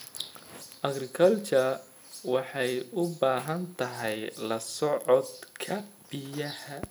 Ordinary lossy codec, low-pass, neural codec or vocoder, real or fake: none; none; none; real